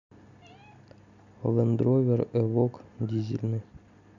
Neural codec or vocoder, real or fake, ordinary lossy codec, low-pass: none; real; none; 7.2 kHz